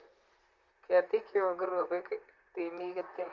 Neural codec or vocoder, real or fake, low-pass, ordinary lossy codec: vocoder, 44.1 kHz, 128 mel bands, Pupu-Vocoder; fake; 7.2 kHz; Opus, 32 kbps